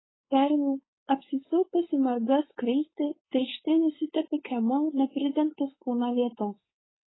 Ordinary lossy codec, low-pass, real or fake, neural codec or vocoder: AAC, 16 kbps; 7.2 kHz; fake; codec, 16 kHz, 4.8 kbps, FACodec